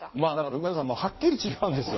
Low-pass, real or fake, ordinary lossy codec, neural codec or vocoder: 7.2 kHz; fake; MP3, 24 kbps; codec, 16 kHz in and 24 kHz out, 1.1 kbps, FireRedTTS-2 codec